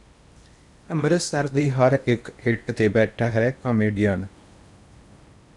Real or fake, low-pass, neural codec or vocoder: fake; 10.8 kHz; codec, 16 kHz in and 24 kHz out, 0.8 kbps, FocalCodec, streaming, 65536 codes